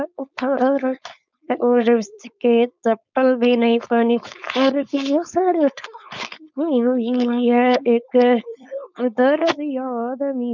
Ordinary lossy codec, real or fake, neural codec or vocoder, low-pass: none; fake; codec, 16 kHz, 4.8 kbps, FACodec; 7.2 kHz